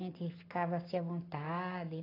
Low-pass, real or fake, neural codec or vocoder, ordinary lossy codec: 5.4 kHz; real; none; AAC, 24 kbps